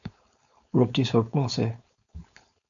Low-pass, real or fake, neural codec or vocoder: 7.2 kHz; fake; codec, 16 kHz, 4.8 kbps, FACodec